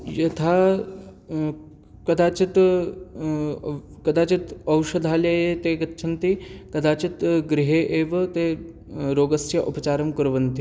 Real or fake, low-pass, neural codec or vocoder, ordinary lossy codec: real; none; none; none